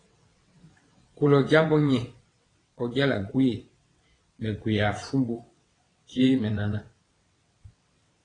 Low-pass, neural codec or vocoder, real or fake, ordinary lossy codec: 9.9 kHz; vocoder, 22.05 kHz, 80 mel bands, WaveNeXt; fake; AAC, 32 kbps